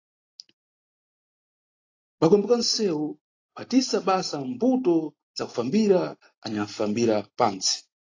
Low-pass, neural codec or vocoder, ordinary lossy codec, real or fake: 7.2 kHz; none; AAC, 32 kbps; real